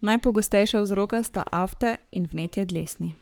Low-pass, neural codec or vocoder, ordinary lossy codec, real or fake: none; codec, 44.1 kHz, 3.4 kbps, Pupu-Codec; none; fake